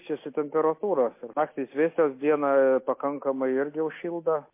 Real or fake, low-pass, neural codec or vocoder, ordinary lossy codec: real; 3.6 kHz; none; MP3, 24 kbps